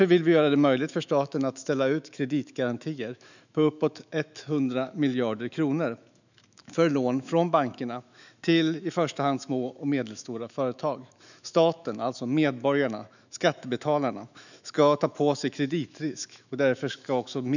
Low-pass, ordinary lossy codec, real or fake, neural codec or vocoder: 7.2 kHz; none; fake; autoencoder, 48 kHz, 128 numbers a frame, DAC-VAE, trained on Japanese speech